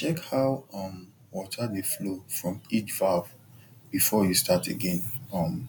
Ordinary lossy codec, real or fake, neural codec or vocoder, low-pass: none; real; none; none